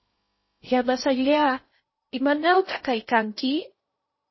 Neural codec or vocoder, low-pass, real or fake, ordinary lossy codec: codec, 16 kHz in and 24 kHz out, 0.6 kbps, FocalCodec, streaming, 2048 codes; 7.2 kHz; fake; MP3, 24 kbps